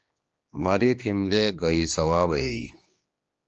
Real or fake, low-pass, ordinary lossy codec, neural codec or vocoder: fake; 7.2 kHz; Opus, 24 kbps; codec, 16 kHz, 2 kbps, X-Codec, HuBERT features, trained on general audio